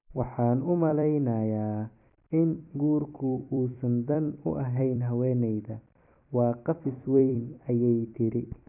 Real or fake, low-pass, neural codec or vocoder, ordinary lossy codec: fake; 3.6 kHz; vocoder, 44.1 kHz, 128 mel bands every 256 samples, BigVGAN v2; none